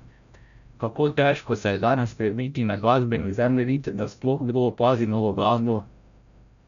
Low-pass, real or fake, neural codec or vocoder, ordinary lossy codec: 7.2 kHz; fake; codec, 16 kHz, 0.5 kbps, FreqCodec, larger model; none